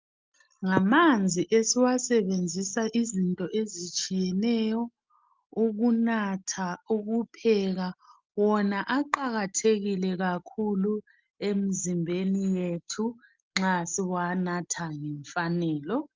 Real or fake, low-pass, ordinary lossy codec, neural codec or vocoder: real; 7.2 kHz; Opus, 32 kbps; none